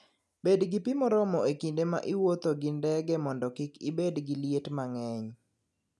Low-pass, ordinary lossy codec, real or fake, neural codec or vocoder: none; none; real; none